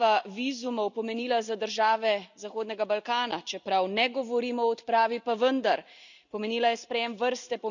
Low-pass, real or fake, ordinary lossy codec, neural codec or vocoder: 7.2 kHz; real; none; none